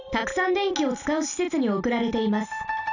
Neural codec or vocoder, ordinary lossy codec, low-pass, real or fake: none; none; 7.2 kHz; real